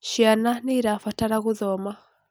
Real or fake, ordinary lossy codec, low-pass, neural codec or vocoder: real; none; none; none